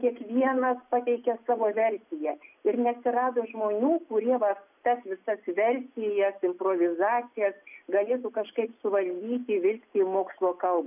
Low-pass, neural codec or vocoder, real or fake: 3.6 kHz; none; real